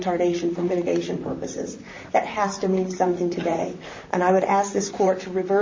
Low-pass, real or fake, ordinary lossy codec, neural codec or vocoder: 7.2 kHz; fake; MP3, 32 kbps; vocoder, 44.1 kHz, 128 mel bands, Pupu-Vocoder